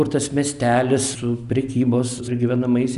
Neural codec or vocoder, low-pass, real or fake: none; 10.8 kHz; real